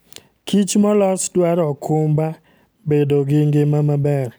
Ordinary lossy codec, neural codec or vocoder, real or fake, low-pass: none; none; real; none